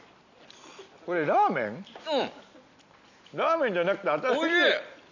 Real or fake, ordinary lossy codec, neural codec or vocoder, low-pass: real; none; none; 7.2 kHz